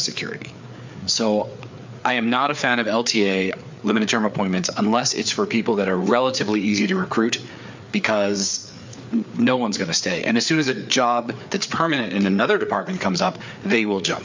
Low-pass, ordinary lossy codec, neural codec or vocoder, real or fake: 7.2 kHz; MP3, 64 kbps; codec, 16 kHz, 4 kbps, FreqCodec, larger model; fake